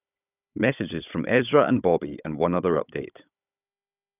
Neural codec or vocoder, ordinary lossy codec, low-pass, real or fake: codec, 16 kHz, 16 kbps, FunCodec, trained on Chinese and English, 50 frames a second; none; 3.6 kHz; fake